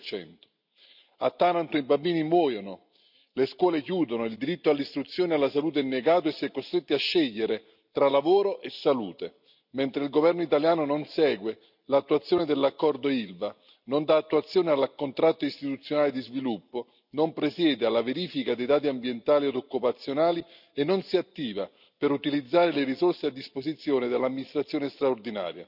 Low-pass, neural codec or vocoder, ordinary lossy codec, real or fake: 5.4 kHz; none; none; real